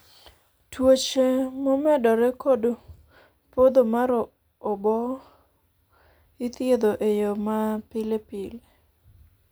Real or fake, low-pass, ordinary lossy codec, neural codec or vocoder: real; none; none; none